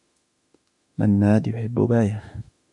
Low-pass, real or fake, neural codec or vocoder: 10.8 kHz; fake; autoencoder, 48 kHz, 32 numbers a frame, DAC-VAE, trained on Japanese speech